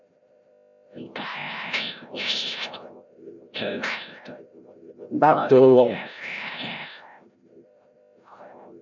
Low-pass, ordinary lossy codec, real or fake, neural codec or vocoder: 7.2 kHz; MP3, 64 kbps; fake; codec, 16 kHz, 0.5 kbps, FreqCodec, larger model